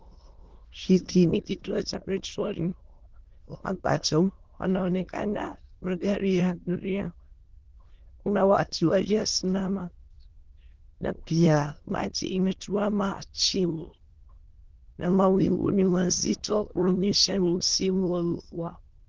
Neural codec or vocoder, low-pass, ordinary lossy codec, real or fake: autoencoder, 22.05 kHz, a latent of 192 numbers a frame, VITS, trained on many speakers; 7.2 kHz; Opus, 16 kbps; fake